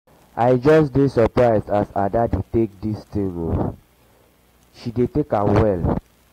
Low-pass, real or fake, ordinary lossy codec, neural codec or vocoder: 19.8 kHz; real; AAC, 48 kbps; none